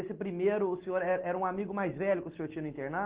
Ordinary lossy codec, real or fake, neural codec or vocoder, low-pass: Opus, 16 kbps; real; none; 3.6 kHz